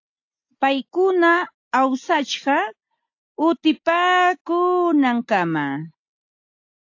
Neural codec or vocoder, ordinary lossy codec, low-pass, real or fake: none; AAC, 48 kbps; 7.2 kHz; real